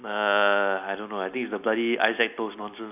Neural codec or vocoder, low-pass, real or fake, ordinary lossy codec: none; 3.6 kHz; real; none